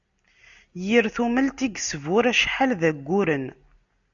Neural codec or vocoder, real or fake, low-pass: none; real; 7.2 kHz